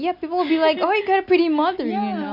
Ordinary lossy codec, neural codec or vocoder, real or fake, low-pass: none; none; real; 5.4 kHz